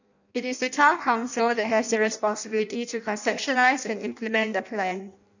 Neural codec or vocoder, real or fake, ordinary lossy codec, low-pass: codec, 16 kHz in and 24 kHz out, 0.6 kbps, FireRedTTS-2 codec; fake; none; 7.2 kHz